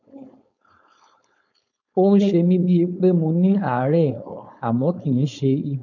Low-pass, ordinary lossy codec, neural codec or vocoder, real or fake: 7.2 kHz; none; codec, 16 kHz, 4.8 kbps, FACodec; fake